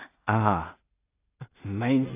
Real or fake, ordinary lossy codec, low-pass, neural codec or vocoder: fake; AAC, 32 kbps; 3.6 kHz; codec, 16 kHz in and 24 kHz out, 0.4 kbps, LongCat-Audio-Codec, two codebook decoder